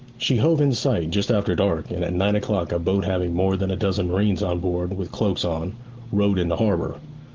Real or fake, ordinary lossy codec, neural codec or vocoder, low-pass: real; Opus, 16 kbps; none; 7.2 kHz